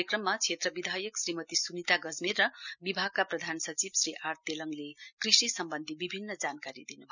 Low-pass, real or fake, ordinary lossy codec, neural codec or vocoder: 7.2 kHz; real; none; none